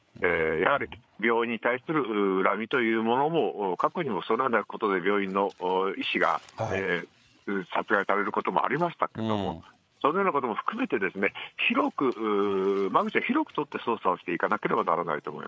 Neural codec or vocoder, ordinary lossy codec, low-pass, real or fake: codec, 16 kHz, 8 kbps, FreqCodec, larger model; none; none; fake